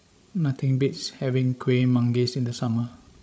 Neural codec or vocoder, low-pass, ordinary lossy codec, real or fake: codec, 16 kHz, 16 kbps, FreqCodec, larger model; none; none; fake